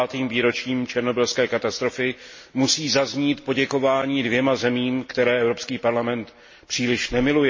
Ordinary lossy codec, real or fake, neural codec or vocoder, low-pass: MP3, 32 kbps; real; none; 7.2 kHz